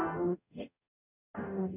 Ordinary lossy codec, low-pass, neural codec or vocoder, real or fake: none; 3.6 kHz; codec, 44.1 kHz, 0.9 kbps, DAC; fake